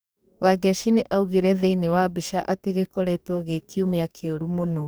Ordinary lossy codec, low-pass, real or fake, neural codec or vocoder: none; none; fake; codec, 44.1 kHz, 2.6 kbps, DAC